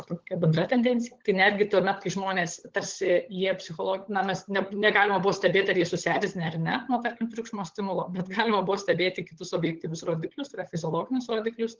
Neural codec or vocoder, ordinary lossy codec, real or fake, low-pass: codec, 16 kHz, 16 kbps, FunCodec, trained on LibriTTS, 50 frames a second; Opus, 16 kbps; fake; 7.2 kHz